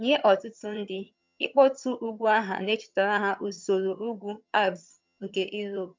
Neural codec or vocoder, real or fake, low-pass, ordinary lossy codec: vocoder, 22.05 kHz, 80 mel bands, HiFi-GAN; fake; 7.2 kHz; MP3, 64 kbps